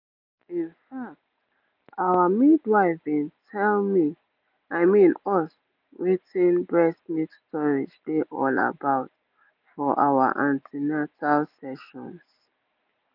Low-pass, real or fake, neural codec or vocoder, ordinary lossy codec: 5.4 kHz; real; none; none